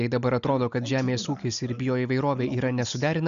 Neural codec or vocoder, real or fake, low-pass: none; real; 7.2 kHz